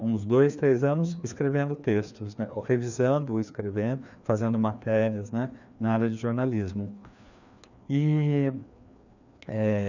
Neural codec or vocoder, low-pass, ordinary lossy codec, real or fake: codec, 16 kHz, 2 kbps, FreqCodec, larger model; 7.2 kHz; none; fake